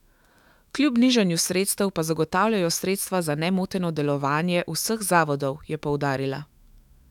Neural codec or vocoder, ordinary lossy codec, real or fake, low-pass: autoencoder, 48 kHz, 128 numbers a frame, DAC-VAE, trained on Japanese speech; none; fake; 19.8 kHz